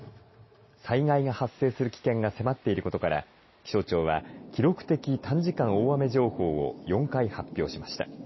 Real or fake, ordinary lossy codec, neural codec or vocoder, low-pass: real; MP3, 24 kbps; none; 7.2 kHz